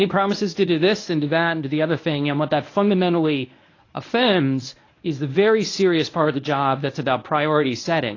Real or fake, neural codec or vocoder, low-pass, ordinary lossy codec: fake; codec, 24 kHz, 0.9 kbps, WavTokenizer, medium speech release version 1; 7.2 kHz; AAC, 32 kbps